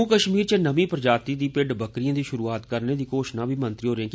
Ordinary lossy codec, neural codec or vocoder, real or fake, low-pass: none; none; real; none